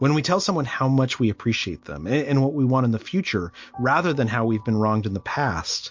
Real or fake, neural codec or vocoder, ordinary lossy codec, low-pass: real; none; MP3, 48 kbps; 7.2 kHz